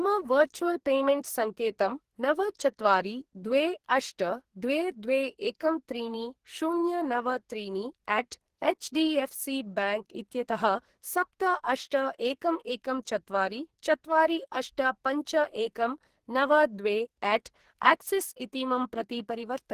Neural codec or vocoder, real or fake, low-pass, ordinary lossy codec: codec, 44.1 kHz, 2.6 kbps, SNAC; fake; 14.4 kHz; Opus, 16 kbps